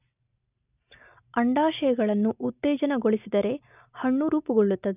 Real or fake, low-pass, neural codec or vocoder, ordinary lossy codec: real; 3.6 kHz; none; none